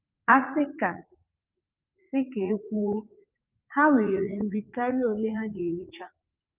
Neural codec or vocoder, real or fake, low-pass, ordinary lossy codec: vocoder, 44.1 kHz, 80 mel bands, Vocos; fake; 3.6 kHz; Opus, 24 kbps